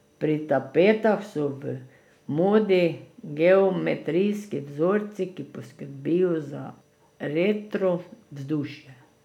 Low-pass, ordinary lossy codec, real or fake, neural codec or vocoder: 19.8 kHz; none; real; none